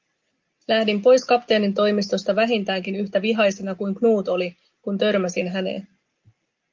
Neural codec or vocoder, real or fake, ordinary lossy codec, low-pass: none; real; Opus, 32 kbps; 7.2 kHz